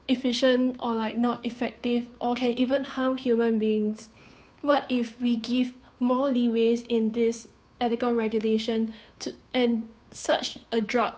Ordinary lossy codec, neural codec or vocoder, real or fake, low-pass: none; codec, 16 kHz, 2 kbps, FunCodec, trained on Chinese and English, 25 frames a second; fake; none